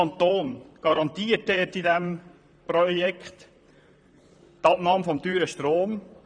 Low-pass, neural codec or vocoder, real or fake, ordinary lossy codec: 9.9 kHz; vocoder, 44.1 kHz, 128 mel bands, Pupu-Vocoder; fake; none